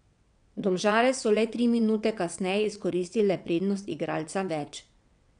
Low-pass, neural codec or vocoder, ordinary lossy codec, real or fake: 9.9 kHz; vocoder, 22.05 kHz, 80 mel bands, WaveNeXt; none; fake